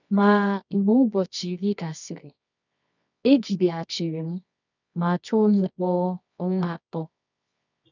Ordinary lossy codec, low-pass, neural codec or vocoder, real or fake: none; 7.2 kHz; codec, 24 kHz, 0.9 kbps, WavTokenizer, medium music audio release; fake